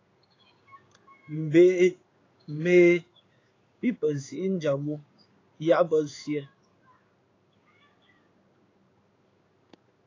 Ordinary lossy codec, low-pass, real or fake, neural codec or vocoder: AAC, 32 kbps; 7.2 kHz; fake; codec, 16 kHz in and 24 kHz out, 1 kbps, XY-Tokenizer